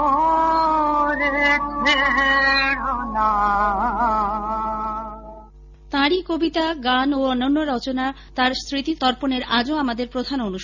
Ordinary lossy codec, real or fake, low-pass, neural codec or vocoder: none; real; 7.2 kHz; none